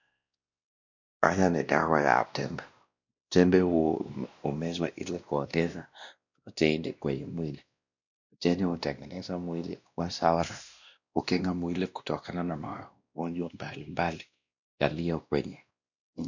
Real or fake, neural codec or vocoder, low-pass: fake; codec, 16 kHz, 1 kbps, X-Codec, WavLM features, trained on Multilingual LibriSpeech; 7.2 kHz